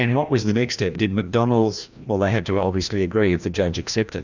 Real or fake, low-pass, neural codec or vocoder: fake; 7.2 kHz; codec, 16 kHz, 1 kbps, FreqCodec, larger model